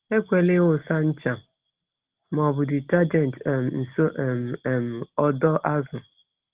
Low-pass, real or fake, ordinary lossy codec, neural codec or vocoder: 3.6 kHz; real; Opus, 16 kbps; none